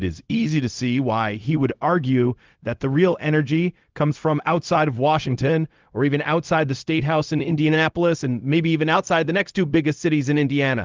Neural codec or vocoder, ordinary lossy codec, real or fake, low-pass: codec, 16 kHz, 0.4 kbps, LongCat-Audio-Codec; Opus, 32 kbps; fake; 7.2 kHz